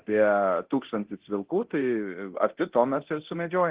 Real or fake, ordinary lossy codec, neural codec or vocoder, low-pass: fake; Opus, 16 kbps; codec, 24 kHz, 0.9 kbps, DualCodec; 3.6 kHz